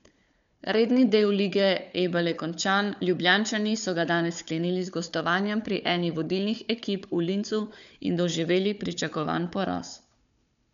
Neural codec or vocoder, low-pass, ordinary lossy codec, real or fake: codec, 16 kHz, 4 kbps, FunCodec, trained on Chinese and English, 50 frames a second; 7.2 kHz; none; fake